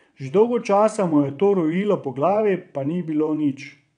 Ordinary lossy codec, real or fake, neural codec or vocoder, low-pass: none; fake; vocoder, 22.05 kHz, 80 mel bands, WaveNeXt; 9.9 kHz